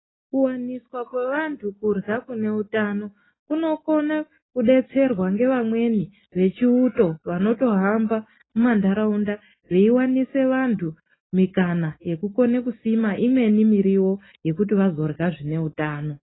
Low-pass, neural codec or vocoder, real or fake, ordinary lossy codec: 7.2 kHz; none; real; AAC, 16 kbps